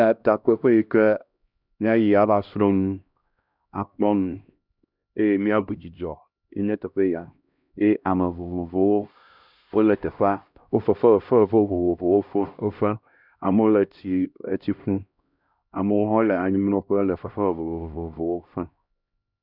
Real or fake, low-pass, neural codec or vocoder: fake; 5.4 kHz; codec, 16 kHz, 1 kbps, X-Codec, HuBERT features, trained on LibriSpeech